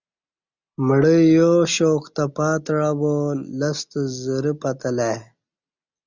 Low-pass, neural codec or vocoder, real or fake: 7.2 kHz; none; real